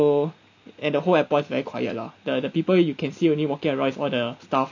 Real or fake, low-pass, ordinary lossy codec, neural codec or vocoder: real; 7.2 kHz; none; none